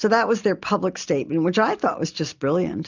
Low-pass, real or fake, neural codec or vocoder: 7.2 kHz; real; none